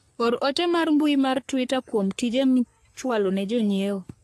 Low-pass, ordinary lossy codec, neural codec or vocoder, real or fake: 14.4 kHz; AAC, 64 kbps; codec, 44.1 kHz, 3.4 kbps, Pupu-Codec; fake